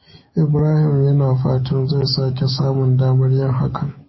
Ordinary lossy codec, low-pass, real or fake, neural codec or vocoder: MP3, 24 kbps; 7.2 kHz; fake; autoencoder, 48 kHz, 128 numbers a frame, DAC-VAE, trained on Japanese speech